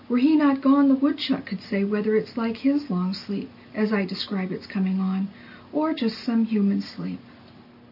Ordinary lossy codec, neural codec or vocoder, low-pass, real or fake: AAC, 48 kbps; none; 5.4 kHz; real